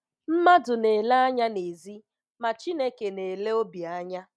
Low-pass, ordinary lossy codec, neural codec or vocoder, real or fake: none; none; none; real